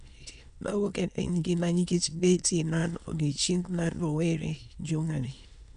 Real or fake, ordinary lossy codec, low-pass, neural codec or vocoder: fake; none; 9.9 kHz; autoencoder, 22.05 kHz, a latent of 192 numbers a frame, VITS, trained on many speakers